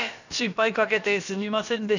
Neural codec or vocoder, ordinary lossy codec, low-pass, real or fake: codec, 16 kHz, about 1 kbps, DyCAST, with the encoder's durations; none; 7.2 kHz; fake